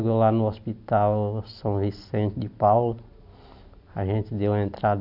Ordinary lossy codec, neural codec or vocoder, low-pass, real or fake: none; none; 5.4 kHz; real